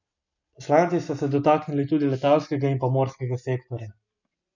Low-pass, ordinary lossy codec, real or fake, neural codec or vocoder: 7.2 kHz; none; real; none